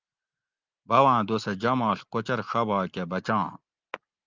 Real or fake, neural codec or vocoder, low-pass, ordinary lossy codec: real; none; 7.2 kHz; Opus, 24 kbps